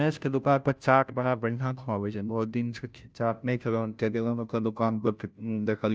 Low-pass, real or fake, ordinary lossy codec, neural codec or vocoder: none; fake; none; codec, 16 kHz, 0.5 kbps, FunCodec, trained on Chinese and English, 25 frames a second